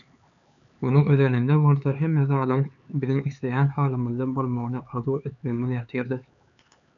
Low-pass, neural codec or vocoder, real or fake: 7.2 kHz; codec, 16 kHz, 4 kbps, X-Codec, HuBERT features, trained on LibriSpeech; fake